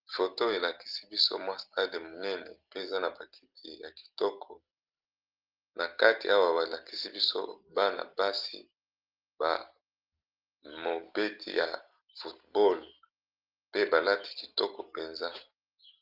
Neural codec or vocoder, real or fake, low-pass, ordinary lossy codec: none; real; 5.4 kHz; Opus, 32 kbps